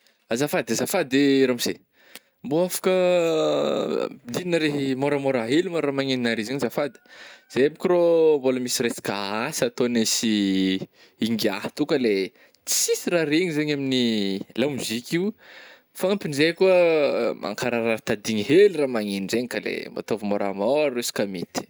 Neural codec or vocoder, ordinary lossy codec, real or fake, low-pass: none; none; real; none